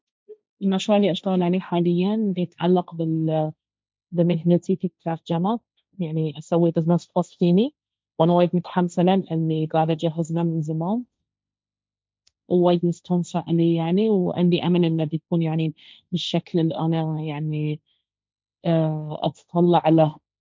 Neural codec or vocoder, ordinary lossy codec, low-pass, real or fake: codec, 16 kHz, 1.1 kbps, Voila-Tokenizer; none; none; fake